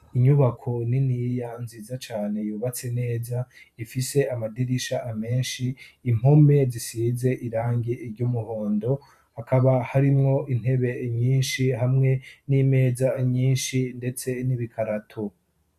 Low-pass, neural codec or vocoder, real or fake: 14.4 kHz; vocoder, 44.1 kHz, 128 mel bands every 512 samples, BigVGAN v2; fake